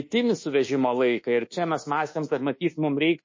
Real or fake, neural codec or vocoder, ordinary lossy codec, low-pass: fake; codec, 24 kHz, 1.2 kbps, DualCodec; MP3, 32 kbps; 7.2 kHz